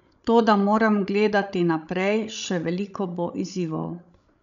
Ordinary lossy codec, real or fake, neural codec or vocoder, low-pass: none; fake; codec, 16 kHz, 16 kbps, FreqCodec, larger model; 7.2 kHz